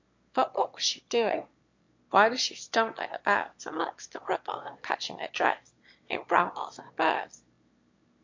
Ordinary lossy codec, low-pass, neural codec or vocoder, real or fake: MP3, 48 kbps; 7.2 kHz; autoencoder, 22.05 kHz, a latent of 192 numbers a frame, VITS, trained on one speaker; fake